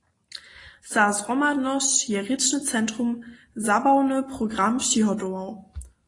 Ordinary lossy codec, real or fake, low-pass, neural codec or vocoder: AAC, 32 kbps; real; 10.8 kHz; none